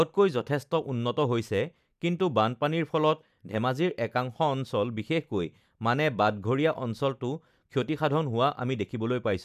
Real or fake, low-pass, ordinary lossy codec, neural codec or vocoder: real; 14.4 kHz; none; none